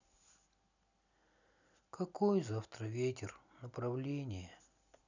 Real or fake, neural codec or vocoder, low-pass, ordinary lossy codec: real; none; 7.2 kHz; none